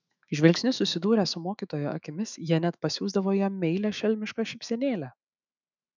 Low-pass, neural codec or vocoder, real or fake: 7.2 kHz; autoencoder, 48 kHz, 128 numbers a frame, DAC-VAE, trained on Japanese speech; fake